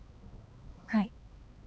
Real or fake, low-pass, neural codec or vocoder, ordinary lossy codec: fake; none; codec, 16 kHz, 2 kbps, X-Codec, HuBERT features, trained on balanced general audio; none